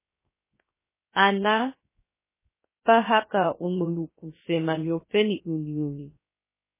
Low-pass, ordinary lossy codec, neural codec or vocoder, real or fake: 3.6 kHz; MP3, 16 kbps; codec, 16 kHz, 0.3 kbps, FocalCodec; fake